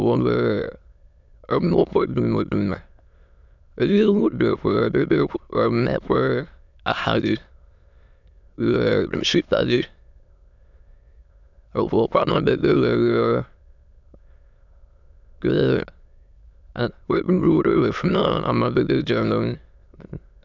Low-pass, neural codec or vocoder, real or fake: 7.2 kHz; autoencoder, 22.05 kHz, a latent of 192 numbers a frame, VITS, trained on many speakers; fake